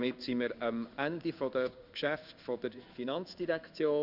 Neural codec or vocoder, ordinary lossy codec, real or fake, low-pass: codec, 16 kHz in and 24 kHz out, 1 kbps, XY-Tokenizer; none; fake; 5.4 kHz